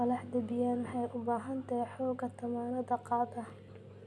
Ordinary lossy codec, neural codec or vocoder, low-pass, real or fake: none; none; none; real